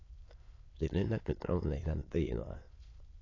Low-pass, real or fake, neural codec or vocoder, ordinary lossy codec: 7.2 kHz; fake; autoencoder, 22.05 kHz, a latent of 192 numbers a frame, VITS, trained on many speakers; AAC, 32 kbps